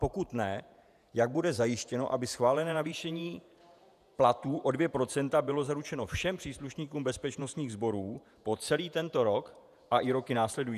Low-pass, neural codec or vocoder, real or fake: 14.4 kHz; vocoder, 48 kHz, 128 mel bands, Vocos; fake